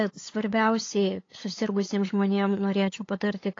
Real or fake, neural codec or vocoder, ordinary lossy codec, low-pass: fake; codec, 16 kHz, 8 kbps, FunCodec, trained on LibriTTS, 25 frames a second; AAC, 32 kbps; 7.2 kHz